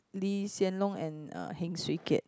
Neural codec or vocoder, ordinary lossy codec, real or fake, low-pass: none; none; real; none